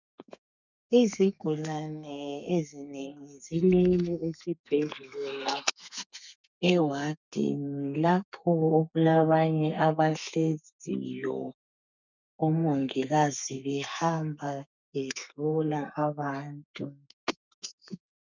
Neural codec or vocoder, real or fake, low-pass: codec, 32 kHz, 1.9 kbps, SNAC; fake; 7.2 kHz